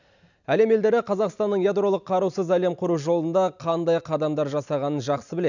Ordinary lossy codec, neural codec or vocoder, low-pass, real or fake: none; none; 7.2 kHz; real